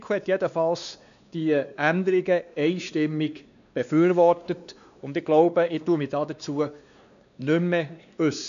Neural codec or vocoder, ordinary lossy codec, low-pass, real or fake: codec, 16 kHz, 2 kbps, X-Codec, WavLM features, trained on Multilingual LibriSpeech; none; 7.2 kHz; fake